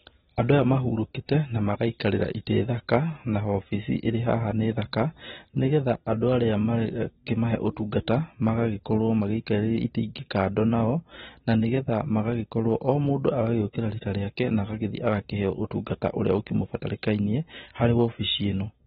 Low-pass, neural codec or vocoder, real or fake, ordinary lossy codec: 7.2 kHz; none; real; AAC, 16 kbps